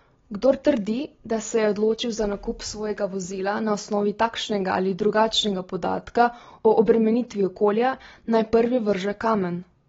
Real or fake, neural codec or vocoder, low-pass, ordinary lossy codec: real; none; 7.2 kHz; AAC, 24 kbps